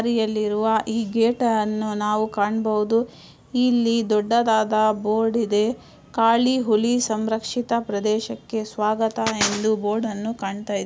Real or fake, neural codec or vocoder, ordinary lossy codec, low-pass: real; none; none; none